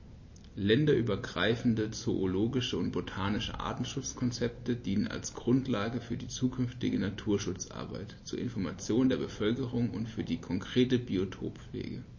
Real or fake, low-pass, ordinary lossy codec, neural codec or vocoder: real; 7.2 kHz; MP3, 32 kbps; none